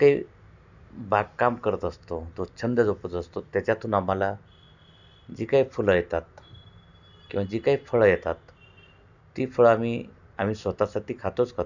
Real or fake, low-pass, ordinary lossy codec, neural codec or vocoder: real; 7.2 kHz; none; none